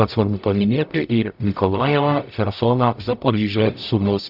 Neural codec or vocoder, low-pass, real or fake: codec, 44.1 kHz, 0.9 kbps, DAC; 5.4 kHz; fake